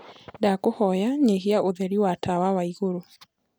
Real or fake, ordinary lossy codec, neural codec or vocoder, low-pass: real; none; none; none